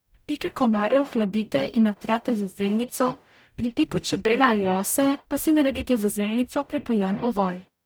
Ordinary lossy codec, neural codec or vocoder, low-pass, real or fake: none; codec, 44.1 kHz, 0.9 kbps, DAC; none; fake